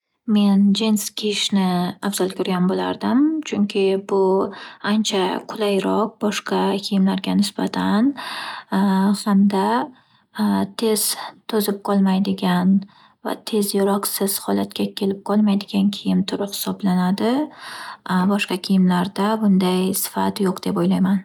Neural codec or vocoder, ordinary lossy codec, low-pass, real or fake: none; none; 19.8 kHz; real